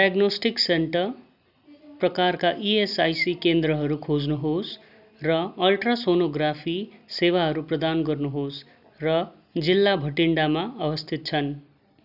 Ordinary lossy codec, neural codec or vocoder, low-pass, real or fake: none; none; 5.4 kHz; real